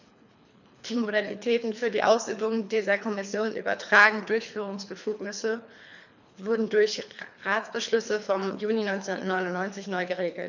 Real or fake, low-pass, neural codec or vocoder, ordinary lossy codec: fake; 7.2 kHz; codec, 24 kHz, 3 kbps, HILCodec; none